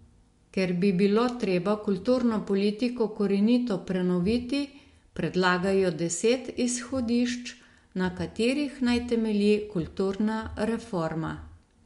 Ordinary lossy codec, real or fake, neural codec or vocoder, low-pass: MP3, 64 kbps; real; none; 10.8 kHz